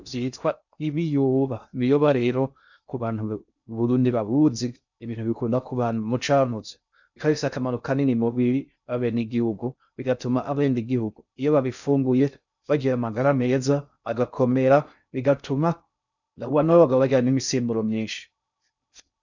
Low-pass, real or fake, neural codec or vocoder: 7.2 kHz; fake; codec, 16 kHz in and 24 kHz out, 0.6 kbps, FocalCodec, streaming, 2048 codes